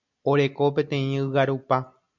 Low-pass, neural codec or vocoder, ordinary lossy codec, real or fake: 7.2 kHz; none; MP3, 64 kbps; real